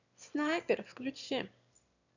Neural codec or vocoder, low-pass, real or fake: autoencoder, 22.05 kHz, a latent of 192 numbers a frame, VITS, trained on one speaker; 7.2 kHz; fake